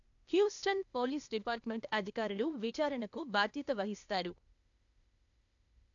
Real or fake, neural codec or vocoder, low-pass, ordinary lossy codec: fake; codec, 16 kHz, 0.8 kbps, ZipCodec; 7.2 kHz; none